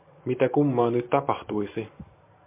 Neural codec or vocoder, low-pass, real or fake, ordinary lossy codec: none; 3.6 kHz; real; MP3, 24 kbps